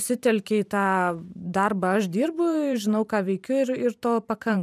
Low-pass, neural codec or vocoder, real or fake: 14.4 kHz; vocoder, 44.1 kHz, 128 mel bands every 512 samples, BigVGAN v2; fake